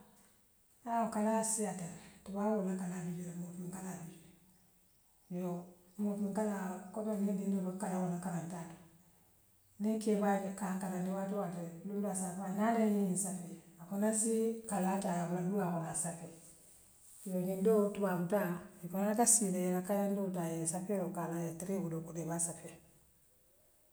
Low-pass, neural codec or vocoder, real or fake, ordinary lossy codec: none; none; real; none